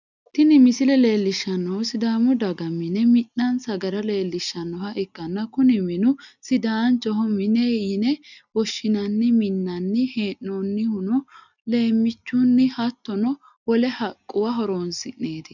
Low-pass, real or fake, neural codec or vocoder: 7.2 kHz; real; none